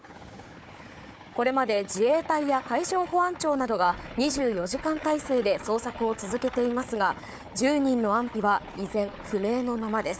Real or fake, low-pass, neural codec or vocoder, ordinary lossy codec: fake; none; codec, 16 kHz, 4 kbps, FunCodec, trained on Chinese and English, 50 frames a second; none